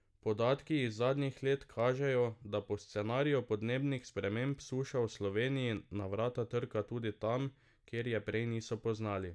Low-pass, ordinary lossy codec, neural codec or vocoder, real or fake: none; none; none; real